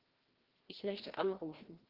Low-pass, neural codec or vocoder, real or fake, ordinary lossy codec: 5.4 kHz; codec, 16 kHz, 1 kbps, FreqCodec, larger model; fake; Opus, 16 kbps